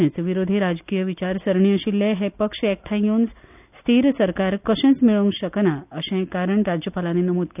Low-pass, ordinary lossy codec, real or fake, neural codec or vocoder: 3.6 kHz; none; real; none